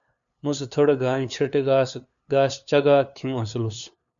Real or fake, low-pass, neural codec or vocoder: fake; 7.2 kHz; codec, 16 kHz, 2 kbps, FunCodec, trained on LibriTTS, 25 frames a second